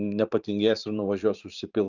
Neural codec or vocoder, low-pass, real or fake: vocoder, 44.1 kHz, 128 mel bands every 512 samples, BigVGAN v2; 7.2 kHz; fake